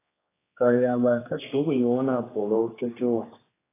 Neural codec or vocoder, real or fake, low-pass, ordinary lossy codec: codec, 16 kHz, 2 kbps, X-Codec, HuBERT features, trained on general audio; fake; 3.6 kHz; AAC, 16 kbps